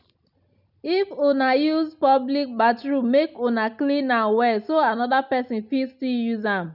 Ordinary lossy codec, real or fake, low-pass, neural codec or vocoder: none; real; 5.4 kHz; none